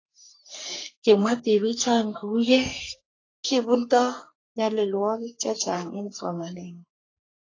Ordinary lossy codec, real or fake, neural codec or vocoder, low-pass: AAC, 32 kbps; fake; codec, 44.1 kHz, 3.4 kbps, Pupu-Codec; 7.2 kHz